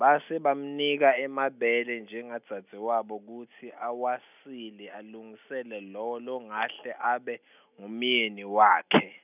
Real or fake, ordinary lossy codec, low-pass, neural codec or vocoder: real; none; 3.6 kHz; none